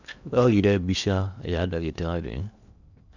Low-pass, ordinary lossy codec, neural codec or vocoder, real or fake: 7.2 kHz; none; codec, 16 kHz in and 24 kHz out, 0.8 kbps, FocalCodec, streaming, 65536 codes; fake